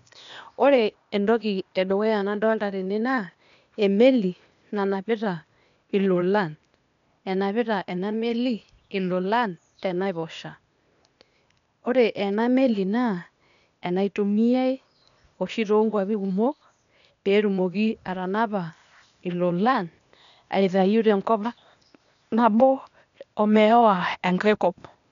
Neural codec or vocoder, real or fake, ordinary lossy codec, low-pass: codec, 16 kHz, 0.8 kbps, ZipCodec; fake; MP3, 96 kbps; 7.2 kHz